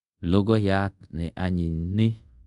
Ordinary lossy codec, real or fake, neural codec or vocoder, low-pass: none; fake; codec, 24 kHz, 0.5 kbps, DualCodec; 10.8 kHz